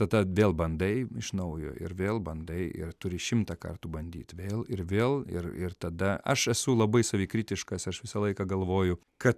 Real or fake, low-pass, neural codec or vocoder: real; 14.4 kHz; none